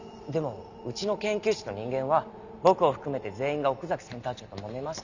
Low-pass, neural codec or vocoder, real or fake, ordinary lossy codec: 7.2 kHz; none; real; none